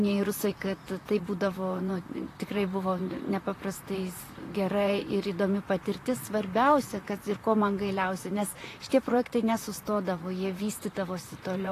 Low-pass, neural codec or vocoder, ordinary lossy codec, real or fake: 14.4 kHz; vocoder, 44.1 kHz, 128 mel bands, Pupu-Vocoder; AAC, 48 kbps; fake